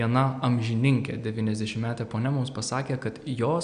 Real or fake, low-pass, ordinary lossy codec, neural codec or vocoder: real; 9.9 kHz; AAC, 96 kbps; none